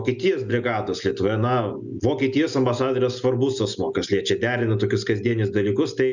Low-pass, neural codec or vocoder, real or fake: 7.2 kHz; none; real